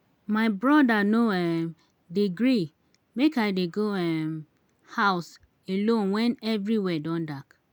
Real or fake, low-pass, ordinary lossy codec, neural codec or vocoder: real; 19.8 kHz; none; none